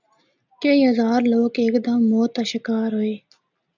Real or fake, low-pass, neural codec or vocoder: real; 7.2 kHz; none